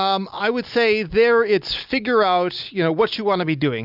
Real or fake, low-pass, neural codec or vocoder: real; 5.4 kHz; none